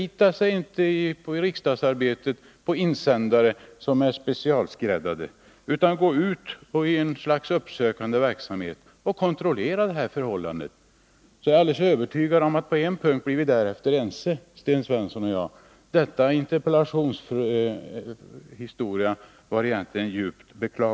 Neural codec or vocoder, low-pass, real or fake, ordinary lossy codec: none; none; real; none